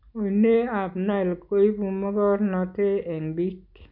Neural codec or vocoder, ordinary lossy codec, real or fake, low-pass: none; none; real; 5.4 kHz